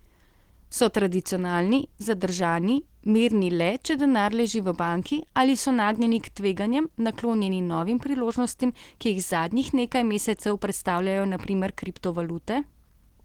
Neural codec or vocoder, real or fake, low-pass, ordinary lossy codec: none; real; 19.8 kHz; Opus, 16 kbps